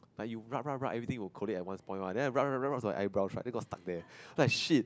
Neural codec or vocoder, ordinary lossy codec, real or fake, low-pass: none; none; real; none